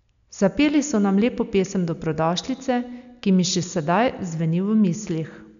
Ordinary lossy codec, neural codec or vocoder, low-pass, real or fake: none; none; 7.2 kHz; real